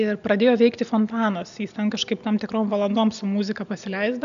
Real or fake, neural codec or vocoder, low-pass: real; none; 7.2 kHz